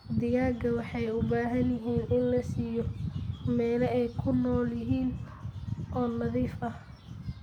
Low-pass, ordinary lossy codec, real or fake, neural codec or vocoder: 19.8 kHz; none; real; none